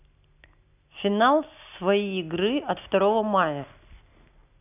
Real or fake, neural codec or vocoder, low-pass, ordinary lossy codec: real; none; 3.6 kHz; none